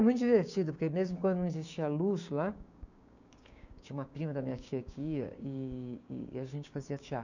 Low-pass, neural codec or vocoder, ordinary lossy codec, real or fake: 7.2 kHz; codec, 16 kHz, 6 kbps, DAC; none; fake